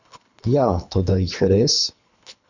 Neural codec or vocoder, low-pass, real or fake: codec, 24 kHz, 3 kbps, HILCodec; 7.2 kHz; fake